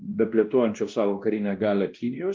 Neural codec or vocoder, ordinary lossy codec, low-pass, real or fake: codec, 16 kHz, 1 kbps, X-Codec, WavLM features, trained on Multilingual LibriSpeech; Opus, 32 kbps; 7.2 kHz; fake